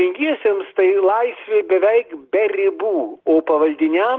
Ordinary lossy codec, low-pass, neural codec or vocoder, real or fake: Opus, 16 kbps; 7.2 kHz; none; real